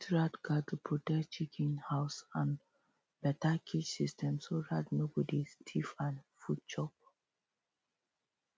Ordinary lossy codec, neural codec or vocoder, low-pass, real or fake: none; none; none; real